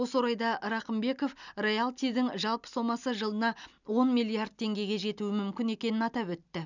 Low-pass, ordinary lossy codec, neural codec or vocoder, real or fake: 7.2 kHz; none; none; real